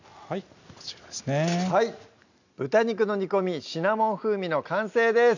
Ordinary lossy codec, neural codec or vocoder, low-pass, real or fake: none; none; 7.2 kHz; real